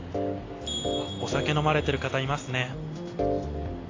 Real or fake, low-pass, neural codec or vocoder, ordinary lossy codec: real; 7.2 kHz; none; AAC, 32 kbps